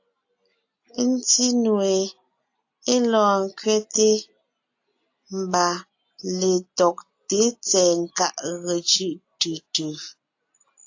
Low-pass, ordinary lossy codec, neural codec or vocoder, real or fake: 7.2 kHz; AAC, 48 kbps; none; real